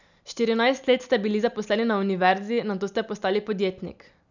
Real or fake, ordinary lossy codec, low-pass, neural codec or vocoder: real; none; 7.2 kHz; none